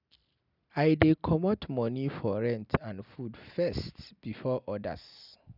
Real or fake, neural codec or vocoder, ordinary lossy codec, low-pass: real; none; none; 5.4 kHz